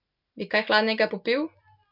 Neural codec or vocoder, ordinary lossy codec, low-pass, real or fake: none; none; 5.4 kHz; real